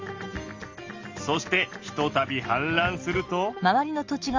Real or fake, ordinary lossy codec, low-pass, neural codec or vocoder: real; Opus, 32 kbps; 7.2 kHz; none